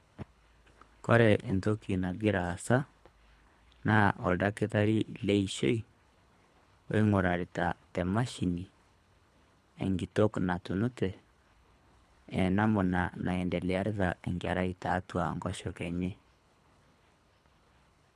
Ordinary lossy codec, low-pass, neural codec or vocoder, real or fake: none; none; codec, 24 kHz, 3 kbps, HILCodec; fake